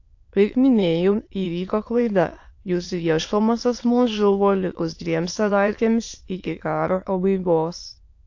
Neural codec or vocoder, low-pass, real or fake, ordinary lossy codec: autoencoder, 22.05 kHz, a latent of 192 numbers a frame, VITS, trained on many speakers; 7.2 kHz; fake; AAC, 48 kbps